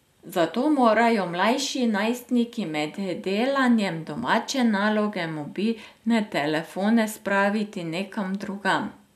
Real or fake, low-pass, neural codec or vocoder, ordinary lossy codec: real; 14.4 kHz; none; MP3, 96 kbps